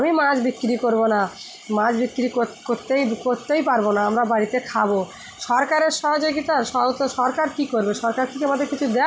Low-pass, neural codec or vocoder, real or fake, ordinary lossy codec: none; none; real; none